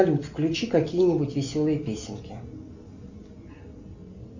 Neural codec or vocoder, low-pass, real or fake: none; 7.2 kHz; real